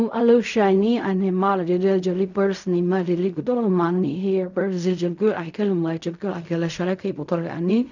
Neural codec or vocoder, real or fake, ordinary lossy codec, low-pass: codec, 16 kHz in and 24 kHz out, 0.4 kbps, LongCat-Audio-Codec, fine tuned four codebook decoder; fake; none; 7.2 kHz